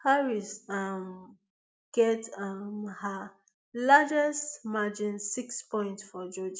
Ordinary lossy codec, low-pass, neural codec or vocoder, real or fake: none; none; none; real